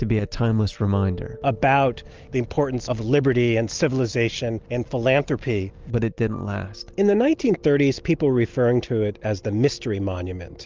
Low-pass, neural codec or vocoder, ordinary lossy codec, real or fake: 7.2 kHz; none; Opus, 24 kbps; real